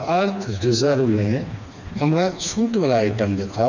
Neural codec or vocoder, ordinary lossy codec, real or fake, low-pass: codec, 16 kHz, 2 kbps, FreqCodec, smaller model; none; fake; 7.2 kHz